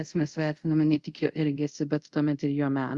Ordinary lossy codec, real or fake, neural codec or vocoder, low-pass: Opus, 64 kbps; fake; codec, 24 kHz, 0.5 kbps, DualCodec; 10.8 kHz